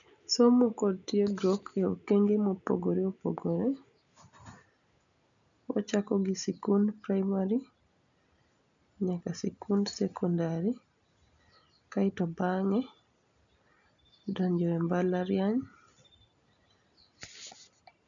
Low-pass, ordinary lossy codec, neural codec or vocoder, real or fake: 7.2 kHz; none; none; real